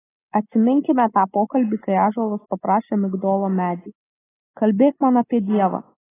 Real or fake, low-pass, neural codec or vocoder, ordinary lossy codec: real; 3.6 kHz; none; AAC, 16 kbps